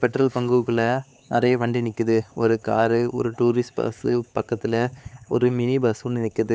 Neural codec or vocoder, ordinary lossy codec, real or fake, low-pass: codec, 16 kHz, 4 kbps, X-Codec, HuBERT features, trained on LibriSpeech; none; fake; none